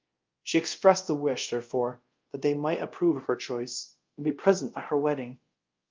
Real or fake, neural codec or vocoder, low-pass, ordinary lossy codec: fake; codec, 24 kHz, 0.5 kbps, DualCodec; 7.2 kHz; Opus, 24 kbps